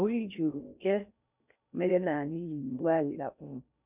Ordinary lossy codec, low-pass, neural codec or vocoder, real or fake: none; 3.6 kHz; codec, 16 kHz in and 24 kHz out, 0.6 kbps, FocalCodec, streaming, 4096 codes; fake